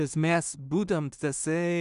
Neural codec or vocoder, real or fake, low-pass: codec, 16 kHz in and 24 kHz out, 0.4 kbps, LongCat-Audio-Codec, two codebook decoder; fake; 10.8 kHz